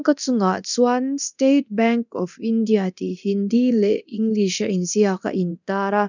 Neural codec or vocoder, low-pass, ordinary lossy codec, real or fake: codec, 24 kHz, 0.9 kbps, DualCodec; 7.2 kHz; none; fake